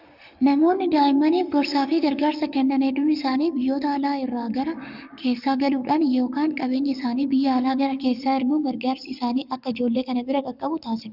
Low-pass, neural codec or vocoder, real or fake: 5.4 kHz; codec, 44.1 kHz, 7.8 kbps, Pupu-Codec; fake